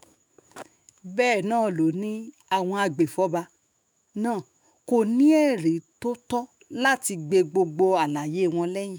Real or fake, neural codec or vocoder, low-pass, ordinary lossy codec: fake; autoencoder, 48 kHz, 128 numbers a frame, DAC-VAE, trained on Japanese speech; none; none